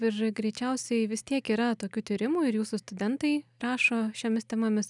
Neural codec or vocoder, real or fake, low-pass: none; real; 10.8 kHz